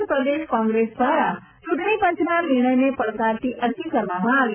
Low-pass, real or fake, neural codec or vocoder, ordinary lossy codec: 3.6 kHz; real; none; none